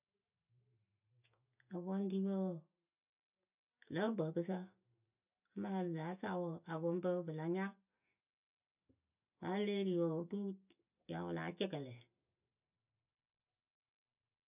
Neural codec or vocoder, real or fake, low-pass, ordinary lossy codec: none; real; 3.6 kHz; none